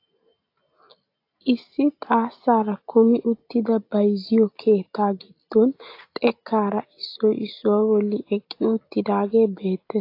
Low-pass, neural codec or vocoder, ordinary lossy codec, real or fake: 5.4 kHz; none; AAC, 32 kbps; real